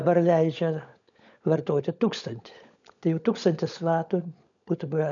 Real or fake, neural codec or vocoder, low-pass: fake; codec, 16 kHz, 16 kbps, FunCodec, trained on LibriTTS, 50 frames a second; 7.2 kHz